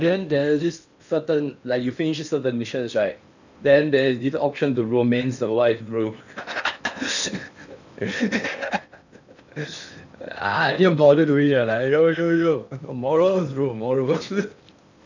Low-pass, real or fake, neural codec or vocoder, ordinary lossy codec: 7.2 kHz; fake; codec, 16 kHz in and 24 kHz out, 0.8 kbps, FocalCodec, streaming, 65536 codes; none